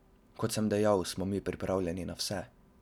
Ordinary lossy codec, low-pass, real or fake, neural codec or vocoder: none; 19.8 kHz; real; none